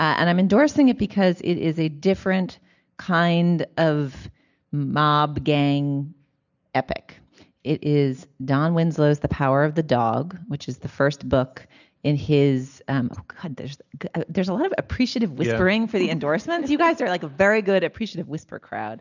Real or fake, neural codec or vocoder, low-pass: real; none; 7.2 kHz